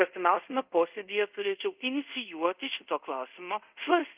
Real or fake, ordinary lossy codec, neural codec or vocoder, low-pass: fake; Opus, 24 kbps; codec, 24 kHz, 0.5 kbps, DualCodec; 3.6 kHz